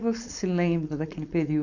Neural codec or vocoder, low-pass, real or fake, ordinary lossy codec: codec, 16 kHz, 4.8 kbps, FACodec; 7.2 kHz; fake; none